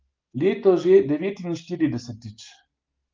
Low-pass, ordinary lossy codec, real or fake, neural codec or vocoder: 7.2 kHz; Opus, 24 kbps; real; none